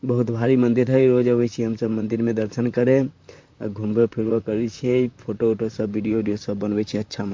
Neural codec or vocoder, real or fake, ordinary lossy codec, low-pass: vocoder, 44.1 kHz, 128 mel bands, Pupu-Vocoder; fake; MP3, 48 kbps; 7.2 kHz